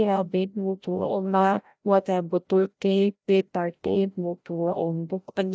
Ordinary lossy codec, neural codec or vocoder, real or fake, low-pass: none; codec, 16 kHz, 0.5 kbps, FreqCodec, larger model; fake; none